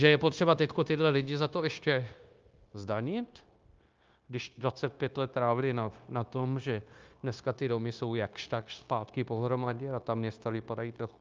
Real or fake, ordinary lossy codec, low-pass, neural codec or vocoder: fake; Opus, 24 kbps; 7.2 kHz; codec, 16 kHz, 0.9 kbps, LongCat-Audio-Codec